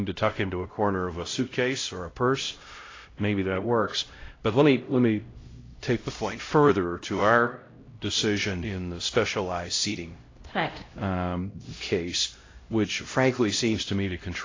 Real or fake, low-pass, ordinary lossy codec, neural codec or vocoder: fake; 7.2 kHz; AAC, 32 kbps; codec, 16 kHz, 0.5 kbps, X-Codec, HuBERT features, trained on LibriSpeech